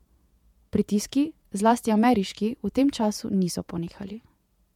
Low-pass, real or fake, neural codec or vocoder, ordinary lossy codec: 19.8 kHz; fake; vocoder, 48 kHz, 128 mel bands, Vocos; MP3, 96 kbps